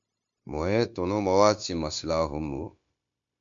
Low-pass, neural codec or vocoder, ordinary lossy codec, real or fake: 7.2 kHz; codec, 16 kHz, 0.9 kbps, LongCat-Audio-Codec; MP3, 64 kbps; fake